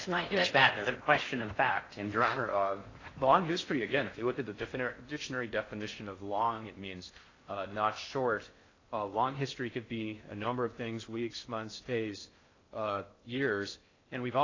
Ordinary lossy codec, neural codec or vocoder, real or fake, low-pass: AAC, 32 kbps; codec, 16 kHz in and 24 kHz out, 0.6 kbps, FocalCodec, streaming, 4096 codes; fake; 7.2 kHz